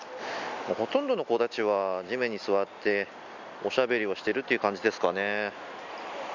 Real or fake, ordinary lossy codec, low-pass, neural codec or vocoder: real; none; 7.2 kHz; none